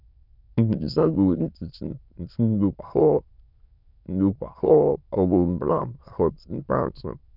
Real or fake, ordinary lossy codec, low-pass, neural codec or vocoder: fake; none; 5.4 kHz; autoencoder, 22.05 kHz, a latent of 192 numbers a frame, VITS, trained on many speakers